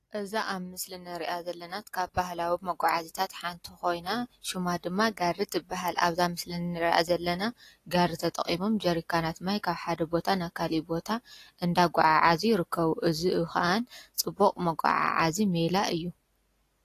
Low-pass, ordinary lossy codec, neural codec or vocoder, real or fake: 14.4 kHz; AAC, 48 kbps; none; real